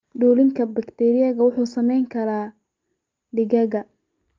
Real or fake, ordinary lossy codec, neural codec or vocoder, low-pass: real; Opus, 24 kbps; none; 7.2 kHz